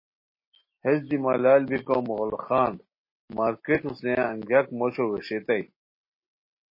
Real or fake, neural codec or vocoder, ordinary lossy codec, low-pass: real; none; MP3, 24 kbps; 5.4 kHz